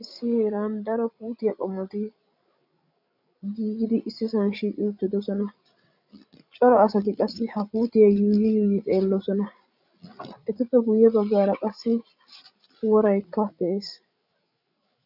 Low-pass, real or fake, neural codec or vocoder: 5.4 kHz; fake; codec, 16 kHz, 16 kbps, FreqCodec, larger model